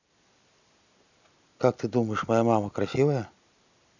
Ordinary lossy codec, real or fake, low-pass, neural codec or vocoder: none; real; 7.2 kHz; none